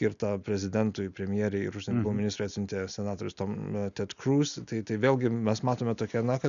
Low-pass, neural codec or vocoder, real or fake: 7.2 kHz; none; real